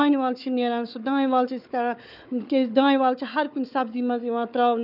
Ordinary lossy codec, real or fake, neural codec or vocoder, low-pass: none; fake; codec, 16 kHz, 4 kbps, FunCodec, trained on Chinese and English, 50 frames a second; 5.4 kHz